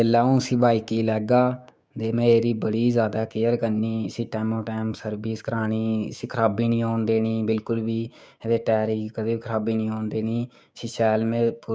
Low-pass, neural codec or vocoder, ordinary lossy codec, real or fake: none; codec, 16 kHz, 6 kbps, DAC; none; fake